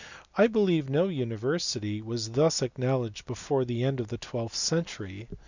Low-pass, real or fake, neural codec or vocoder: 7.2 kHz; real; none